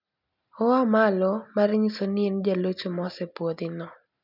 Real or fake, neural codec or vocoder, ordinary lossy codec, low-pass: real; none; none; 5.4 kHz